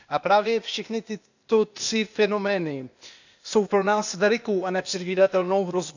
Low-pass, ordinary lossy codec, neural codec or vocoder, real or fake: 7.2 kHz; none; codec, 16 kHz, 0.8 kbps, ZipCodec; fake